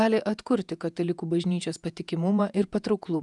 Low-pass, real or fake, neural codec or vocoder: 10.8 kHz; fake; vocoder, 48 kHz, 128 mel bands, Vocos